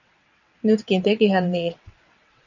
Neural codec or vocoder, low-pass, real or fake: vocoder, 22.05 kHz, 80 mel bands, WaveNeXt; 7.2 kHz; fake